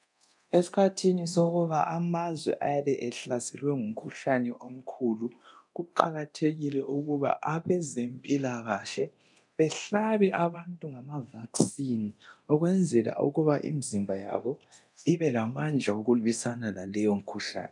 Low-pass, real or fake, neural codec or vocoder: 10.8 kHz; fake; codec, 24 kHz, 0.9 kbps, DualCodec